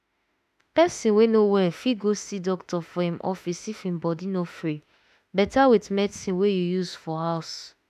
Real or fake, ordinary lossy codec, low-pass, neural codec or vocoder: fake; none; 14.4 kHz; autoencoder, 48 kHz, 32 numbers a frame, DAC-VAE, trained on Japanese speech